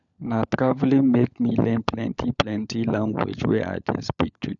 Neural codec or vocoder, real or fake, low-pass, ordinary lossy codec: codec, 16 kHz, 16 kbps, FunCodec, trained on LibriTTS, 50 frames a second; fake; 7.2 kHz; none